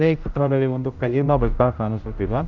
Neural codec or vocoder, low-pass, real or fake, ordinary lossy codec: codec, 16 kHz, 0.5 kbps, X-Codec, HuBERT features, trained on general audio; 7.2 kHz; fake; none